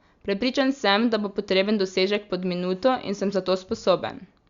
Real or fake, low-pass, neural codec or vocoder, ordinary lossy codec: real; 7.2 kHz; none; Opus, 64 kbps